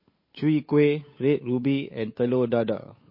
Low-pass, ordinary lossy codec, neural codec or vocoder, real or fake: 5.4 kHz; MP3, 24 kbps; codec, 16 kHz, 8 kbps, FunCodec, trained on Chinese and English, 25 frames a second; fake